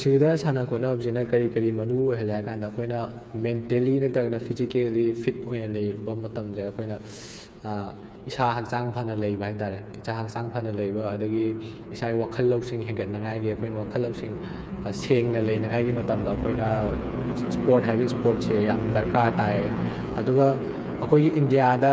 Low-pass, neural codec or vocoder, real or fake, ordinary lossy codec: none; codec, 16 kHz, 4 kbps, FreqCodec, smaller model; fake; none